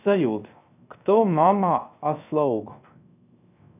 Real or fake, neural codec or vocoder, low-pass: fake; codec, 16 kHz, 0.3 kbps, FocalCodec; 3.6 kHz